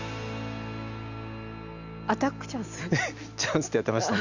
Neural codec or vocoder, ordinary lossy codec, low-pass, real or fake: none; none; 7.2 kHz; real